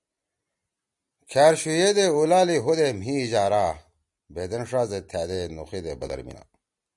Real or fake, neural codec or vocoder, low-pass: real; none; 10.8 kHz